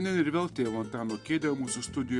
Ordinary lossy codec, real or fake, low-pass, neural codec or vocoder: Opus, 64 kbps; real; 10.8 kHz; none